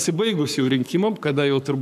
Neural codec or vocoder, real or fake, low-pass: codec, 44.1 kHz, 7.8 kbps, Pupu-Codec; fake; 14.4 kHz